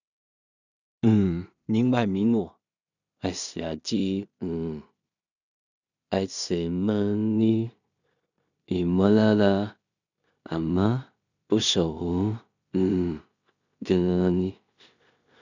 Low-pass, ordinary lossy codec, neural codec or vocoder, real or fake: 7.2 kHz; none; codec, 16 kHz in and 24 kHz out, 0.4 kbps, LongCat-Audio-Codec, two codebook decoder; fake